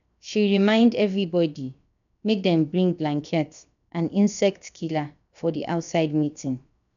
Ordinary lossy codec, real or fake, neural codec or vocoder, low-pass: none; fake; codec, 16 kHz, 0.7 kbps, FocalCodec; 7.2 kHz